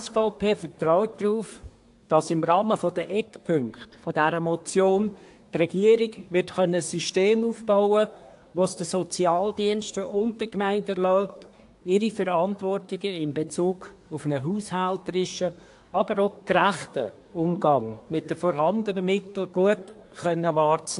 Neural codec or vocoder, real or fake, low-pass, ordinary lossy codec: codec, 24 kHz, 1 kbps, SNAC; fake; 10.8 kHz; AAC, 64 kbps